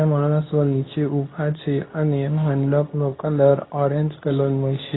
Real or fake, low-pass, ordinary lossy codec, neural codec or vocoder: fake; 7.2 kHz; AAC, 16 kbps; codec, 24 kHz, 0.9 kbps, WavTokenizer, medium speech release version 2